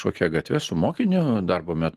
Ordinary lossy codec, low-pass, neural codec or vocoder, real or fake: Opus, 24 kbps; 14.4 kHz; vocoder, 44.1 kHz, 128 mel bands every 512 samples, BigVGAN v2; fake